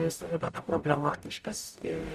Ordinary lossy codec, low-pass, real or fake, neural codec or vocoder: Opus, 64 kbps; 14.4 kHz; fake; codec, 44.1 kHz, 0.9 kbps, DAC